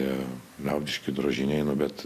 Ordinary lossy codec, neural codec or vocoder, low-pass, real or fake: AAC, 64 kbps; none; 14.4 kHz; real